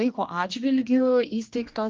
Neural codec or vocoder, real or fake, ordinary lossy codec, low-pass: codec, 16 kHz, 1 kbps, X-Codec, HuBERT features, trained on general audio; fake; Opus, 24 kbps; 7.2 kHz